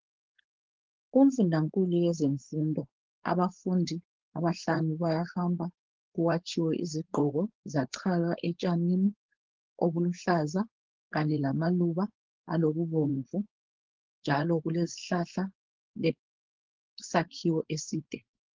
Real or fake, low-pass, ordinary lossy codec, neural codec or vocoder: fake; 7.2 kHz; Opus, 16 kbps; codec, 16 kHz, 4.8 kbps, FACodec